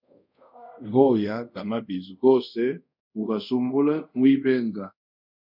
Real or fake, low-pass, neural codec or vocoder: fake; 5.4 kHz; codec, 24 kHz, 0.5 kbps, DualCodec